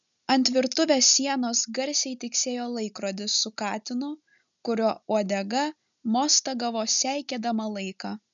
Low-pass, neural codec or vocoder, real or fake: 7.2 kHz; none; real